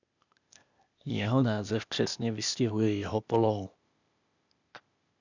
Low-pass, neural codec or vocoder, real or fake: 7.2 kHz; codec, 16 kHz, 0.8 kbps, ZipCodec; fake